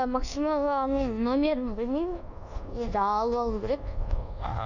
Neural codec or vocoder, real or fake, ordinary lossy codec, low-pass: codec, 24 kHz, 1.2 kbps, DualCodec; fake; none; 7.2 kHz